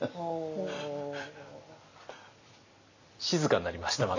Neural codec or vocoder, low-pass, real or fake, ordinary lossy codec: none; 7.2 kHz; real; AAC, 48 kbps